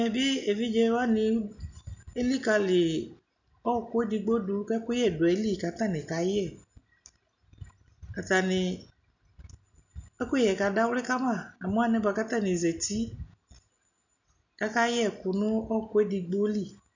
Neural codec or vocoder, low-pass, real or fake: none; 7.2 kHz; real